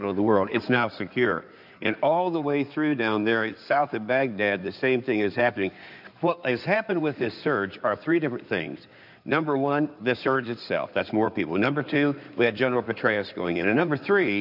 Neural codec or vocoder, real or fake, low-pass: codec, 16 kHz in and 24 kHz out, 2.2 kbps, FireRedTTS-2 codec; fake; 5.4 kHz